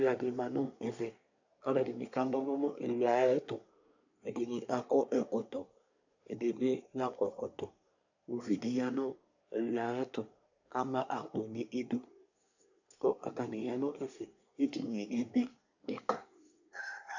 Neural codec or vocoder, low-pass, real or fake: codec, 24 kHz, 1 kbps, SNAC; 7.2 kHz; fake